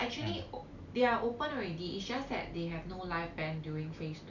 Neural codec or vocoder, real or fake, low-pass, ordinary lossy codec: none; real; 7.2 kHz; none